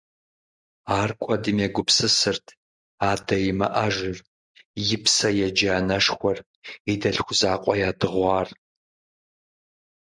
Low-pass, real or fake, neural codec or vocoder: 9.9 kHz; real; none